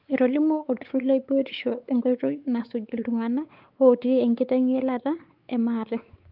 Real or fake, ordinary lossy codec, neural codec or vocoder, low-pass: fake; Opus, 24 kbps; codec, 16 kHz, 4 kbps, X-Codec, WavLM features, trained on Multilingual LibriSpeech; 5.4 kHz